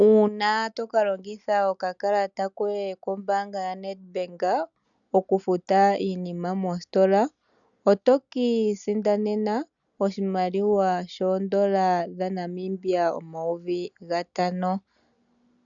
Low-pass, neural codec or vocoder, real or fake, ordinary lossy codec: 7.2 kHz; none; real; MP3, 96 kbps